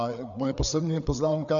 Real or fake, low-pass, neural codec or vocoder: fake; 7.2 kHz; codec, 16 kHz, 4 kbps, FreqCodec, larger model